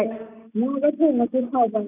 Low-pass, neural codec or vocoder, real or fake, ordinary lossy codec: 3.6 kHz; none; real; none